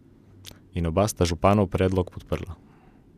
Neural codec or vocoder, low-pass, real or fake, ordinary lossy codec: none; 14.4 kHz; real; none